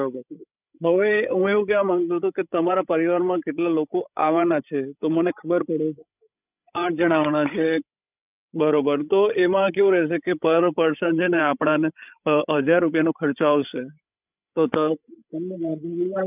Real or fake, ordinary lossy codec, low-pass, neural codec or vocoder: fake; none; 3.6 kHz; codec, 16 kHz, 16 kbps, FreqCodec, larger model